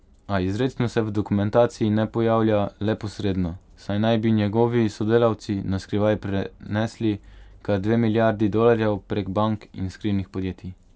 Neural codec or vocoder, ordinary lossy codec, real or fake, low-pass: none; none; real; none